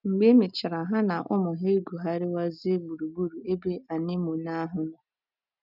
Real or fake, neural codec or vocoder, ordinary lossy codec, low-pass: real; none; none; 5.4 kHz